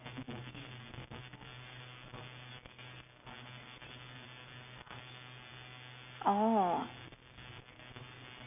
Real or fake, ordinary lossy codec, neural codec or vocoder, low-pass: real; none; none; 3.6 kHz